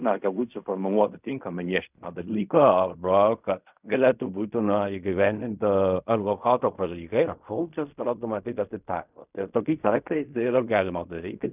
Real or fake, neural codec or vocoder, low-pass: fake; codec, 16 kHz in and 24 kHz out, 0.4 kbps, LongCat-Audio-Codec, fine tuned four codebook decoder; 3.6 kHz